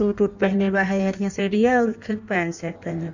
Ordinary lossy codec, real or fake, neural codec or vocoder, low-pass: none; fake; codec, 16 kHz in and 24 kHz out, 1.1 kbps, FireRedTTS-2 codec; 7.2 kHz